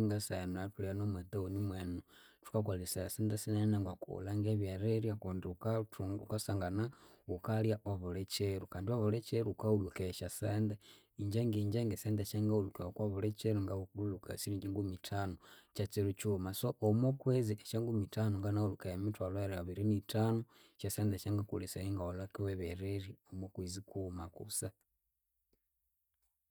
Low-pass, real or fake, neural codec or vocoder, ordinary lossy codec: none; real; none; none